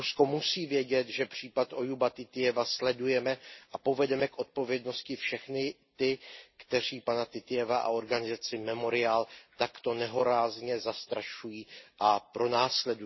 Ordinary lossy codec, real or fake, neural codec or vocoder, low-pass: MP3, 24 kbps; real; none; 7.2 kHz